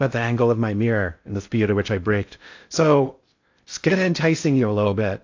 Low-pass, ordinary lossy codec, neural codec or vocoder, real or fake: 7.2 kHz; AAC, 48 kbps; codec, 16 kHz in and 24 kHz out, 0.6 kbps, FocalCodec, streaming, 2048 codes; fake